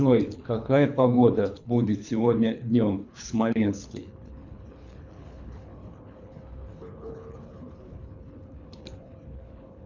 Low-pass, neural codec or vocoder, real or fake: 7.2 kHz; codec, 24 kHz, 3 kbps, HILCodec; fake